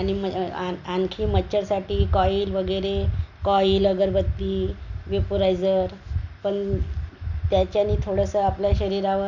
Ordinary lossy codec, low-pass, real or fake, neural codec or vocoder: none; 7.2 kHz; real; none